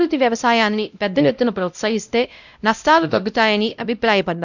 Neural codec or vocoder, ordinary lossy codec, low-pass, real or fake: codec, 16 kHz, 0.5 kbps, X-Codec, WavLM features, trained on Multilingual LibriSpeech; none; 7.2 kHz; fake